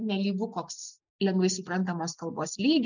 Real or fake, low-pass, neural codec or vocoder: real; 7.2 kHz; none